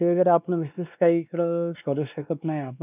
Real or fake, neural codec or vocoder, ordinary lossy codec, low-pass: fake; codec, 16 kHz, 2 kbps, X-Codec, WavLM features, trained on Multilingual LibriSpeech; none; 3.6 kHz